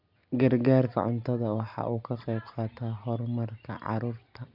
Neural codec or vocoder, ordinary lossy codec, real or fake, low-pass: none; none; real; 5.4 kHz